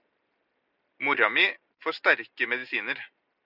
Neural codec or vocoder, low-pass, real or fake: none; 5.4 kHz; real